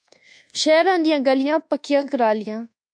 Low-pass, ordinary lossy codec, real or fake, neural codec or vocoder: 9.9 kHz; MP3, 64 kbps; fake; codec, 24 kHz, 1.2 kbps, DualCodec